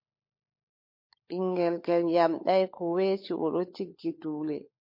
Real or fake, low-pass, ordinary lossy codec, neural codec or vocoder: fake; 5.4 kHz; MP3, 32 kbps; codec, 16 kHz, 16 kbps, FunCodec, trained on LibriTTS, 50 frames a second